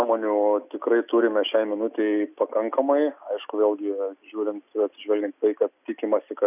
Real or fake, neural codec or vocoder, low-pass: real; none; 3.6 kHz